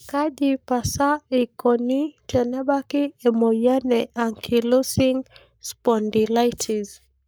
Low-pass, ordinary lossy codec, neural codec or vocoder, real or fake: none; none; codec, 44.1 kHz, 7.8 kbps, Pupu-Codec; fake